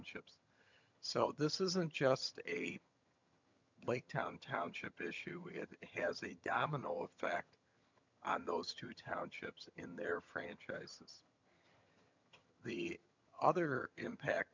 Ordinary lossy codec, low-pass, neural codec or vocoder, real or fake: MP3, 64 kbps; 7.2 kHz; vocoder, 22.05 kHz, 80 mel bands, HiFi-GAN; fake